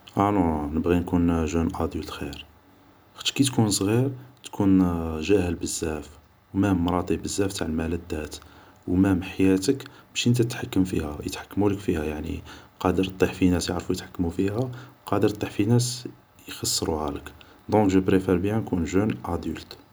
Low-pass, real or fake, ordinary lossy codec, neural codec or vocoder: none; real; none; none